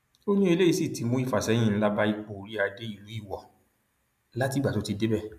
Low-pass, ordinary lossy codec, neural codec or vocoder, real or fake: 14.4 kHz; none; none; real